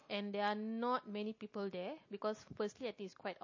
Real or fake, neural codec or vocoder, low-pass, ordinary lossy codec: real; none; 7.2 kHz; MP3, 32 kbps